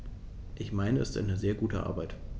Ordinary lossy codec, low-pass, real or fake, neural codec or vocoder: none; none; real; none